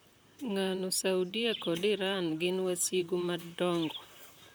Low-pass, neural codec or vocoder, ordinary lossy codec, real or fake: none; vocoder, 44.1 kHz, 128 mel bands, Pupu-Vocoder; none; fake